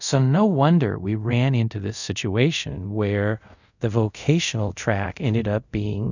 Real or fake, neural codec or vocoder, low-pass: fake; codec, 24 kHz, 0.5 kbps, DualCodec; 7.2 kHz